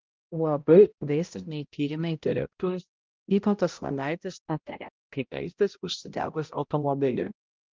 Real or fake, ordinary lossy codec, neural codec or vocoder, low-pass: fake; Opus, 24 kbps; codec, 16 kHz, 0.5 kbps, X-Codec, HuBERT features, trained on balanced general audio; 7.2 kHz